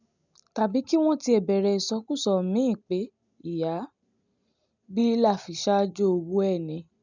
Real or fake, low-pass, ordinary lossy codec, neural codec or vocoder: real; 7.2 kHz; none; none